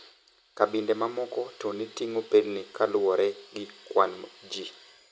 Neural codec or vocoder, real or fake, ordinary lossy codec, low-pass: none; real; none; none